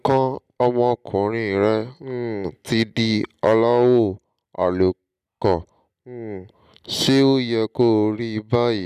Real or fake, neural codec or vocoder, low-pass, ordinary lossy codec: real; none; 14.4 kHz; none